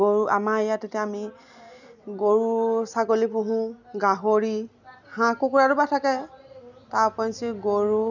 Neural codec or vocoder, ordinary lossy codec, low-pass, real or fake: none; none; 7.2 kHz; real